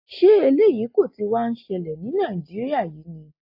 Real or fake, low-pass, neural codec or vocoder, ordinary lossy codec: real; 5.4 kHz; none; AAC, 48 kbps